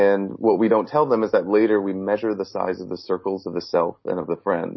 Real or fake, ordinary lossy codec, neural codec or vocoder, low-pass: real; MP3, 24 kbps; none; 7.2 kHz